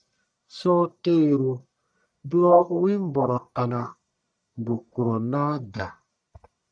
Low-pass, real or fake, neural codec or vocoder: 9.9 kHz; fake; codec, 44.1 kHz, 1.7 kbps, Pupu-Codec